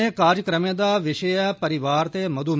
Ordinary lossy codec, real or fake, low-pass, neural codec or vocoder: none; real; none; none